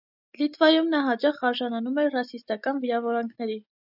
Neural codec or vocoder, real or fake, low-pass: none; real; 5.4 kHz